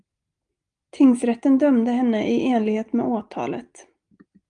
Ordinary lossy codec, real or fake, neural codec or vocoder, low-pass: Opus, 32 kbps; real; none; 9.9 kHz